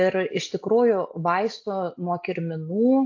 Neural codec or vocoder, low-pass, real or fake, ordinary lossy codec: none; 7.2 kHz; real; AAC, 48 kbps